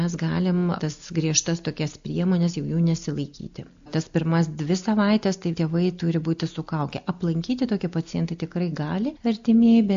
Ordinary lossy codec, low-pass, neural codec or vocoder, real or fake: AAC, 64 kbps; 7.2 kHz; none; real